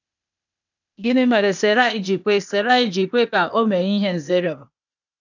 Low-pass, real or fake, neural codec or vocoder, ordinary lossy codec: 7.2 kHz; fake; codec, 16 kHz, 0.8 kbps, ZipCodec; none